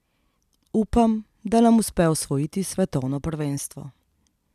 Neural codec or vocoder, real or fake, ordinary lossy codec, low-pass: vocoder, 44.1 kHz, 128 mel bands every 256 samples, BigVGAN v2; fake; none; 14.4 kHz